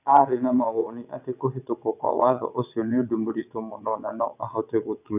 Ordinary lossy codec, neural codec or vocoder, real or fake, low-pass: none; vocoder, 22.05 kHz, 80 mel bands, WaveNeXt; fake; 3.6 kHz